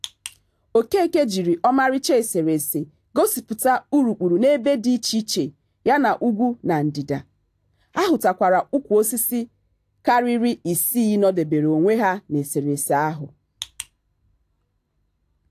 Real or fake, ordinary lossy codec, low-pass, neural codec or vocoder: real; AAC, 64 kbps; 14.4 kHz; none